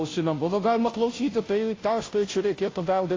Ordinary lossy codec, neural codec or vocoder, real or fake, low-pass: AAC, 32 kbps; codec, 16 kHz, 0.5 kbps, FunCodec, trained on Chinese and English, 25 frames a second; fake; 7.2 kHz